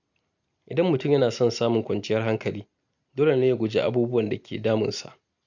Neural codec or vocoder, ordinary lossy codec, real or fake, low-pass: none; none; real; 7.2 kHz